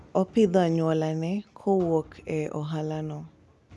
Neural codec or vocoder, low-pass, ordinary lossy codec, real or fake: none; none; none; real